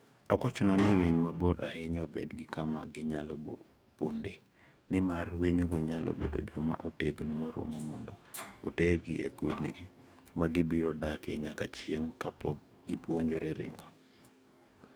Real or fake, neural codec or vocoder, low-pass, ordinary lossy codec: fake; codec, 44.1 kHz, 2.6 kbps, DAC; none; none